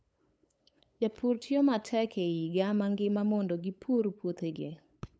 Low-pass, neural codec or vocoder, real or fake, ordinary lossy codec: none; codec, 16 kHz, 8 kbps, FunCodec, trained on LibriTTS, 25 frames a second; fake; none